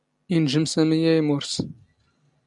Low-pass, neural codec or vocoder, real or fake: 10.8 kHz; none; real